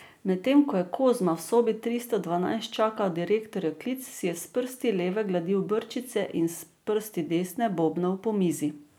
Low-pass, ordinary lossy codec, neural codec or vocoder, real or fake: none; none; none; real